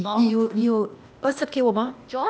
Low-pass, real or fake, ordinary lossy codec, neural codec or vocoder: none; fake; none; codec, 16 kHz, 0.8 kbps, ZipCodec